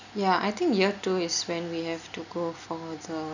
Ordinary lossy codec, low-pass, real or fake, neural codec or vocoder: none; 7.2 kHz; real; none